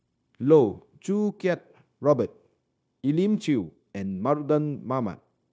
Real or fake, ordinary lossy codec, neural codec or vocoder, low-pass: fake; none; codec, 16 kHz, 0.9 kbps, LongCat-Audio-Codec; none